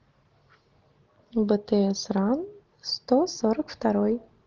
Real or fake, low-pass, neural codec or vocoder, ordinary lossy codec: real; 7.2 kHz; none; Opus, 16 kbps